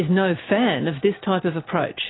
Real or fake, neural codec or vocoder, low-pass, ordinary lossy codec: real; none; 7.2 kHz; AAC, 16 kbps